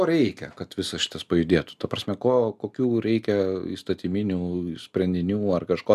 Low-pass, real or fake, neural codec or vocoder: 14.4 kHz; real; none